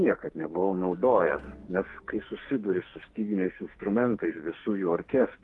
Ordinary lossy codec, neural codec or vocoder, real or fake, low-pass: Opus, 24 kbps; codec, 44.1 kHz, 2.6 kbps, SNAC; fake; 10.8 kHz